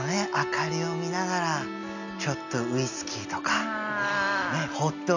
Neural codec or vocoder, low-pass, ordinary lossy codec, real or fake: none; 7.2 kHz; none; real